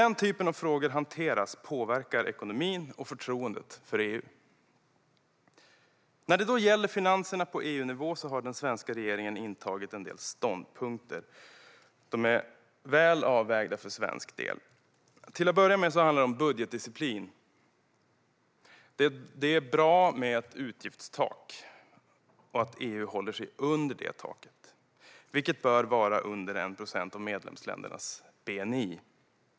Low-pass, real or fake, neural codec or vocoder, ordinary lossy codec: none; real; none; none